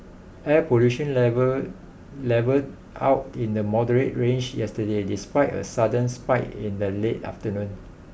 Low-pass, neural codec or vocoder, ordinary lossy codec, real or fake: none; none; none; real